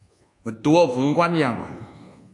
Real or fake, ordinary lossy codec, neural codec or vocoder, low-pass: fake; AAC, 48 kbps; codec, 24 kHz, 1.2 kbps, DualCodec; 10.8 kHz